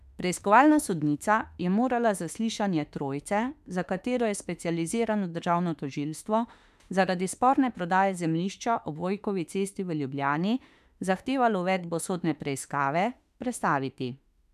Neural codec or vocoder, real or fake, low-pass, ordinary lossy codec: autoencoder, 48 kHz, 32 numbers a frame, DAC-VAE, trained on Japanese speech; fake; 14.4 kHz; none